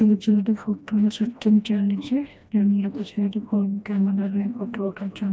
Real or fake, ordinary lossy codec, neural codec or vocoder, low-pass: fake; none; codec, 16 kHz, 1 kbps, FreqCodec, smaller model; none